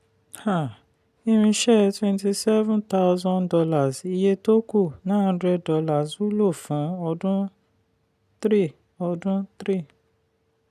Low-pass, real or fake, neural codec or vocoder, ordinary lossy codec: 14.4 kHz; real; none; none